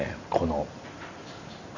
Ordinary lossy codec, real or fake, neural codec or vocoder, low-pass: none; real; none; 7.2 kHz